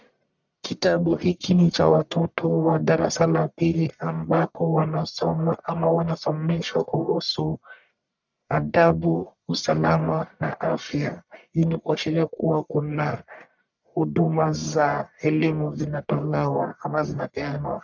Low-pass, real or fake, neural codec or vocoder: 7.2 kHz; fake; codec, 44.1 kHz, 1.7 kbps, Pupu-Codec